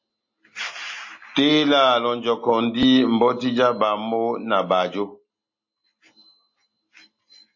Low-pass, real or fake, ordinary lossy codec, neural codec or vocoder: 7.2 kHz; real; MP3, 32 kbps; none